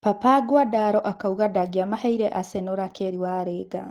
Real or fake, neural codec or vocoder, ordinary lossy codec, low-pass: real; none; Opus, 16 kbps; 19.8 kHz